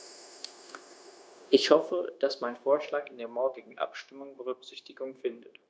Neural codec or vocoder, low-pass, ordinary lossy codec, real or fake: codec, 16 kHz, 0.9 kbps, LongCat-Audio-Codec; none; none; fake